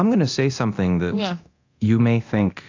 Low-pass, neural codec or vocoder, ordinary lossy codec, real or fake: 7.2 kHz; codec, 24 kHz, 0.9 kbps, DualCodec; AAC, 48 kbps; fake